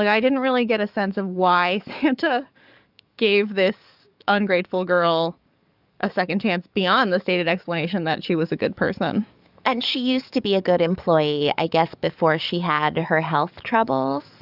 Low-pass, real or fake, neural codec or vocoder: 5.4 kHz; fake; codec, 44.1 kHz, 7.8 kbps, DAC